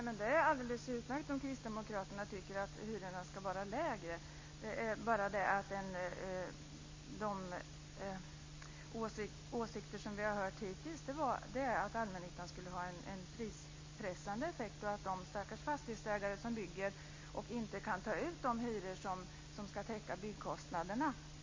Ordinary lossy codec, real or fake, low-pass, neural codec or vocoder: MP3, 32 kbps; real; 7.2 kHz; none